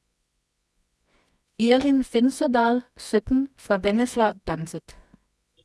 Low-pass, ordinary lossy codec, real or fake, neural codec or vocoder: none; none; fake; codec, 24 kHz, 0.9 kbps, WavTokenizer, medium music audio release